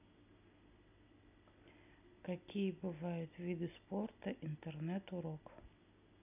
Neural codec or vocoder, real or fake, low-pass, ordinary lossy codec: none; real; 3.6 kHz; none